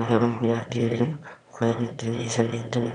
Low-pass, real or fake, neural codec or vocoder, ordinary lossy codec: 9.9 kHz; fake; autoencoder, 22.05 kHz, a latent of 192 numbers a frame, VITS, trained on one speaker; none